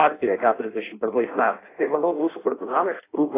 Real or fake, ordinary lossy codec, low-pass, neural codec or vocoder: fake; AAC, 16 kbps; 3.6 kHz; codec, 16 kHz in and 24 kHz out, 0.6 kbps, FireRedTTS-2 codec